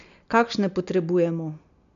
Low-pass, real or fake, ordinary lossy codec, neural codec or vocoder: 7.2 kHz; real; none; none